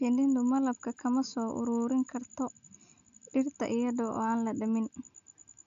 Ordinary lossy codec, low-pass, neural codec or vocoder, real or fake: none; 7.2 kHz; none; real